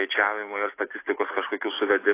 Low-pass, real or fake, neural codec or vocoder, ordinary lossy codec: 3.6 kHz; real; none; AAC, 24 kbps